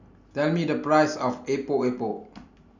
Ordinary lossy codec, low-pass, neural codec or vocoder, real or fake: none; 7.2 kHz; none; real